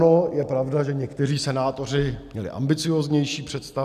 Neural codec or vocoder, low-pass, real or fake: none; 14.4 kHz; real